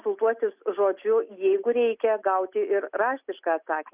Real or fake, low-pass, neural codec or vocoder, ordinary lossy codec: real; 3.6 kHz; none; Opus, 64 kbps